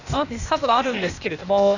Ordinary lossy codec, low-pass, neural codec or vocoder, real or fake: none; 7.2 kHz; codec, 16 kHz, 0.8 kbps, ZipCodec; fake